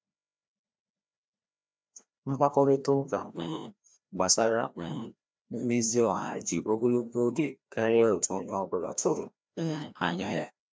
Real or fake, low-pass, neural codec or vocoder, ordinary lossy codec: fake; none; codec, 16 kHz, 1 kbps, FreqCodec, larger model; none